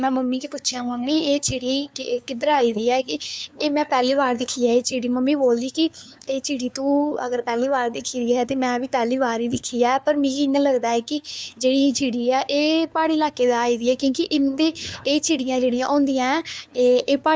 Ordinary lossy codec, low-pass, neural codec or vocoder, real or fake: none; none; codec, 16 kHz, 2 kbps, FunCodec, trained on LibriTTS, 25 frames a second; fake